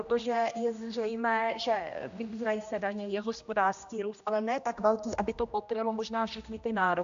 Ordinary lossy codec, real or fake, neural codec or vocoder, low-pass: AAC, 64 kbps; fake; codec, 16 kHz, 1 kbps, X-Codec, HuBERT features, trained on general audio; 7.2 kHz